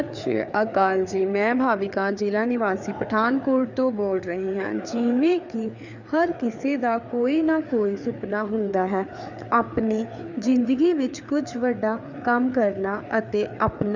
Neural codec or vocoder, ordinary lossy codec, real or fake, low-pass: codec, 16 kHz, 4 kbps, FreqCodec, larger model; none; fake; 7.2 kHz